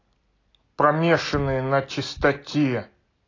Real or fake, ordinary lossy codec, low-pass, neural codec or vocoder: real; AAC, 32 kbps; 7.2 kHz; none